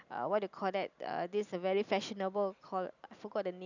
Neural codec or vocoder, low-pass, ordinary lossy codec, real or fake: none; 7.2 kHz; none; real